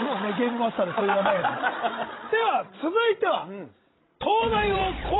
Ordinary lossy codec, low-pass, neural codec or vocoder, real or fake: AAC, 16 kbps; 7.2 kHz; codec, 16 kHz, 16 kbps, FreqCodec, larger model; fake